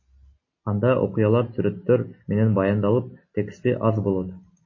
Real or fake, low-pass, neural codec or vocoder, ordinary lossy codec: real; 7.2 kHz; none; MP3, 32 kbps